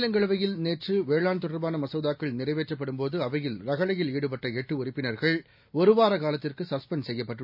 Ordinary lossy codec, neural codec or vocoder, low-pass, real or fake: MP3, 48 kbps; none; 5.4 kHz; real